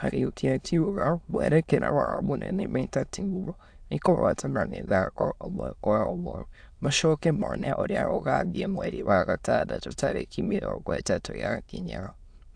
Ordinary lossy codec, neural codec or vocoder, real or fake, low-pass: AAC, 64 kbps; autoencoder, 22.05 kHz, a latent of 192 numbers a frame, VITS, trained on many speakers; fake; 9.9 kHz